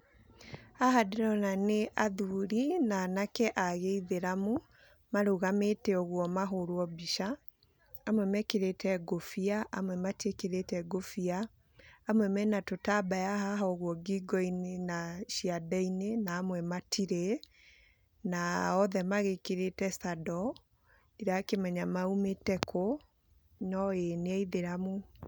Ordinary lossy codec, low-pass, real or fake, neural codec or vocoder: none; none; real; none